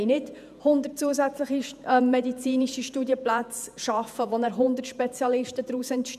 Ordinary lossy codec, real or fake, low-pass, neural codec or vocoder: none; fake; 14.4 kHz; vocoder, 48 kHz, 128 mel bands, Vocos